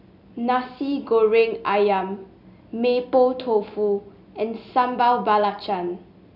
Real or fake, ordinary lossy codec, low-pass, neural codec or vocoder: real; none; 5.4 kHz; none